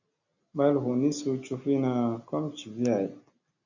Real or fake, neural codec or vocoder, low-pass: real; none; 7.2 kHz